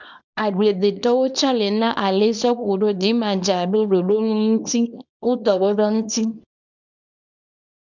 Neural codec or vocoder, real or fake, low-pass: codec, 24 kHz, 0.9 kbps, WavTokenizer, small release; fake; 7.2 kHz